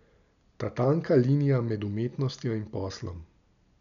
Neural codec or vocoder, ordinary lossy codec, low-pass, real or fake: none; none; 7.2 kHz; real